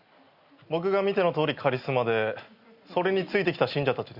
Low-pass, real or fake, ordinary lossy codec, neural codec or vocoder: 5.4 kHz; real; Opus, 64 kbps; none